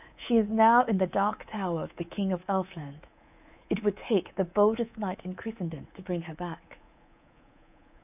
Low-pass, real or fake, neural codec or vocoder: 3.6 kHz; fake; codec, 24 kHz, 3.1 kbps, DualCodec